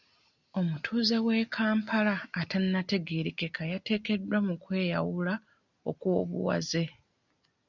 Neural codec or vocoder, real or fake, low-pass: none; real; 7.2 kHz